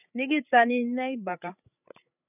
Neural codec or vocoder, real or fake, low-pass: codec, 16 kHz, 16 kbps, FreqCodec, larger model; fake; 3.6 kHz